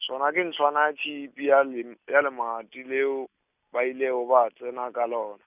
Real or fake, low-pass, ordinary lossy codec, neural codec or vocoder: real; 3.6 kHz; none; none